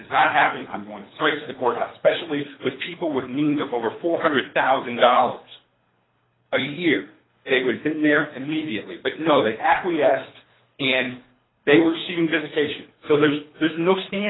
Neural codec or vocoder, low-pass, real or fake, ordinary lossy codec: codec, 24 kHz, 3 kbps, HILCodec; 7.2 kHz; fake; AAC, 16 kbps